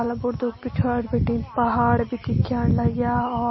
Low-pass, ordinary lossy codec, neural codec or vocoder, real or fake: 7.2 kHz; MP3, 24 kbps; none; real